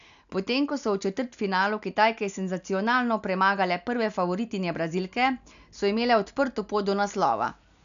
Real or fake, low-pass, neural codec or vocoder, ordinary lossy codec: real; 7.2 kHz; none; none